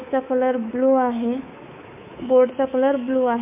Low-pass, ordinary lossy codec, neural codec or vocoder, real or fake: 3.6 kHz; MP3, 32 kbps; codec, 24 kHz, 3.1 kbps, DualCodec; fake